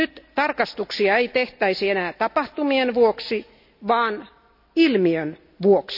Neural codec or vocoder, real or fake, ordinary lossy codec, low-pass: none; real; none; 5.4 kHz